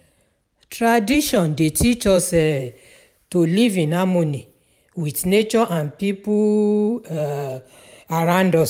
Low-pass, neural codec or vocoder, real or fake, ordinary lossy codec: 19.8 kHz; vocoder, 44.1 kHz, 128 mel bands every 512 samples, BigVGAN v2; fake; none